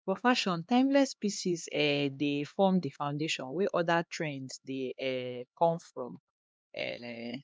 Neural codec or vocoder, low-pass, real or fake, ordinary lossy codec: codec, 16 kHz, 2 kbps, X-Codec, HuBERT features, trained on LibriSpeech; none; fake; none